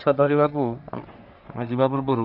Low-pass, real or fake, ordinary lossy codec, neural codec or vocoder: 5.4 kHz; fake; none; codec, 44.1 kHz, 3.4 kbps, Pupu-Codec